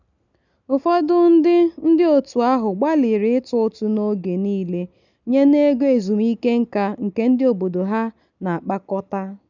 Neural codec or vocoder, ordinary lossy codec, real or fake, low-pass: none; none; real; 7.2 kHz